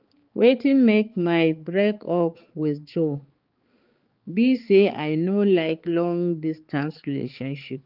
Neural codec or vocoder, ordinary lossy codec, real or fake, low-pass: codec, 16 kHz, 4 kbps, X-Codec, HuBERT features, trained on balanced general audio; Opus, 32 kbps; fake; 5.4 kHz